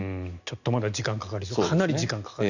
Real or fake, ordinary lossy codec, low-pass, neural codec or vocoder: real; none; 7.2 kHz; none